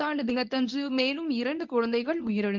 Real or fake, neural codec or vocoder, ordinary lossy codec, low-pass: fake; codec, 24 kHz, 0.9 kbps, WavTokenizer, medium speech release version 1; Opus, 24 kbps; 7.2 kHz